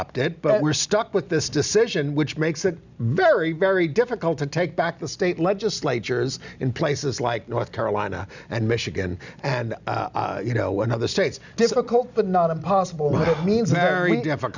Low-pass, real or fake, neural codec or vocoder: 7.2 kHz; real; none